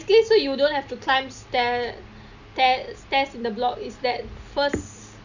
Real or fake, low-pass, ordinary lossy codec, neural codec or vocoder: real; 7.2 kHz; none; none